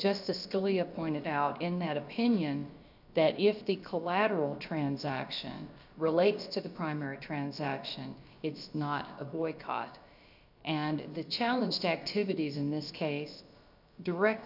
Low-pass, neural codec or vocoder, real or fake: 5.4 kHz; codec, 16 kHz, about 1 kbps, DyCAST, with the encoder's durations; fake